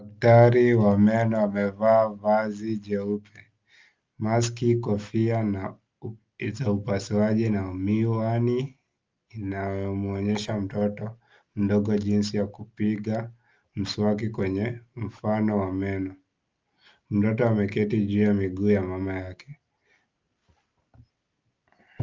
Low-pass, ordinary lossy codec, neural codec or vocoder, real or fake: 7.2 kHz; Opus, 24 kbps; none; real